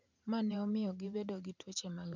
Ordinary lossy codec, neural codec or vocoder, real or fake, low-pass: none; vocoder, 44.1 kHz, 128 mel bands every 512 samples, BigVGAN v2; fake; 7.2 kHz